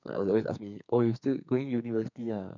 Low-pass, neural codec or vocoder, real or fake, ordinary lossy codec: 7.2 kHz; codec, 44.1 kHz, 2.6 kbps, SNAC; fake; none